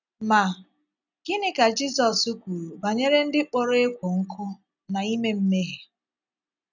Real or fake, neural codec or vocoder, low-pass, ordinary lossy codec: real; none; 7.2 kHz; none